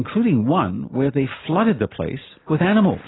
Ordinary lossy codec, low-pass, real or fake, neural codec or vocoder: AAC, 16 kbps; 7.2 kHz; real; none